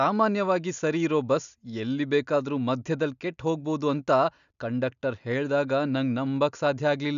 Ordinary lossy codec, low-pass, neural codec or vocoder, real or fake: none; 7.2 kHz; none; real